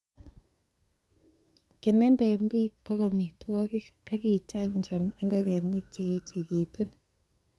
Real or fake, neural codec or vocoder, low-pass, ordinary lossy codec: fake; codec, 24 kHz, 1 kbps, SNAC; none; none